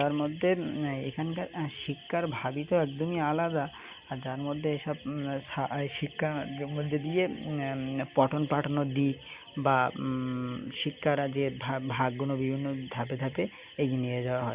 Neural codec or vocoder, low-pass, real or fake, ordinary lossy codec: none; 3.6 kHz; real; Opus, 64 kbps